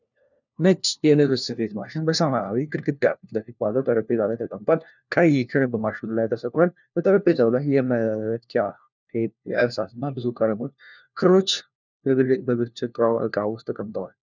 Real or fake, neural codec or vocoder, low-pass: fake; codec, 16 kHz, 1 kbps, FunCodec, trained on LibriTTS, 50 frames a second; 7.2 kHz